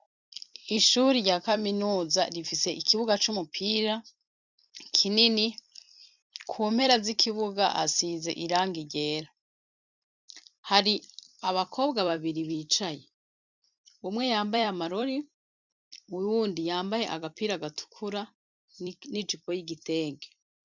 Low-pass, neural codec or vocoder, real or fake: 7.2 kHz; vocoder, 44.1 kHz, 128 mel bands every 256 samples, BigVGAN v2; fake